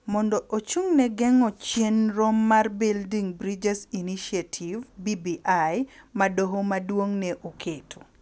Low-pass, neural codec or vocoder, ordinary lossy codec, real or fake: none; none; none; real